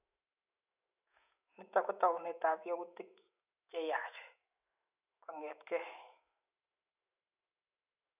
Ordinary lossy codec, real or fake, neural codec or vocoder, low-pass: none; fake; autoencoder, 48 kHz, 128 numbers a frame, DAC-VAE, trained on Japanese speech; 3.6 kHz